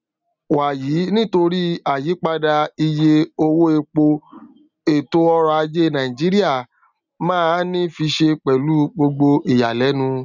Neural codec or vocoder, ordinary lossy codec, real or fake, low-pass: none; none; real; 7.2 kHz